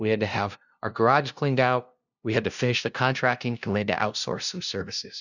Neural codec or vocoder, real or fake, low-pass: codec, 16 kHz, 0.5 kbps, FunCodec, trained on LibriTTS, 25 frames a second; fake; 7.2 kHz